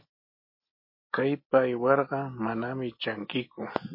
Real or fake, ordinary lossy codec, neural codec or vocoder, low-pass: real; MP3, 24 kbps; none; 5.4 kHz